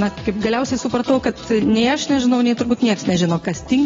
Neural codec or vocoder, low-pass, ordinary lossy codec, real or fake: none; 7.2 kHz; AAC, 24 kbps; real